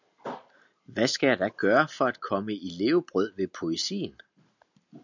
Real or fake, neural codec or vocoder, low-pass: real; none; 7.2 kHz